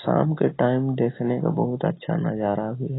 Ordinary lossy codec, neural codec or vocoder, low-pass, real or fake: AAC, 16 kbps; none; 7.2 kHz; real